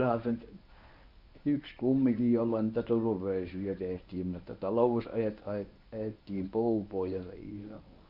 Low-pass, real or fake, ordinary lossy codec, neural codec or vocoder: 5.4 kHz; fake; none; codec, 24 kHz, 0.9 kbps, WavTokenizer, medium speech release version 1